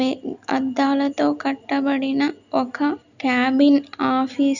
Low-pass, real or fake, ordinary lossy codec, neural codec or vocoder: 7.2 kHz; real; none; none